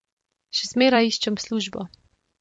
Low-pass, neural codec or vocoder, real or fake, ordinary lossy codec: 10.8 kHz; vocoder, 44.1 kHz, 128 mel bands every 256 samples, BigVGAN v2; fake; MP3, 48 kbps